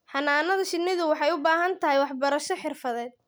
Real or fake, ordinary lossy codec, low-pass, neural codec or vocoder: real; none; none; none